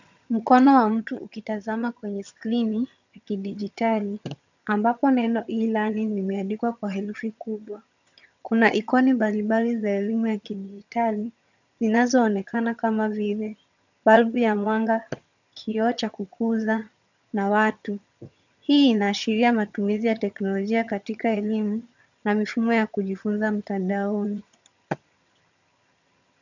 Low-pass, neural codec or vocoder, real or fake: 7.2 kHz; vocoder, 22.05 kHz, 80 mel bands, HiFi-GAN; fake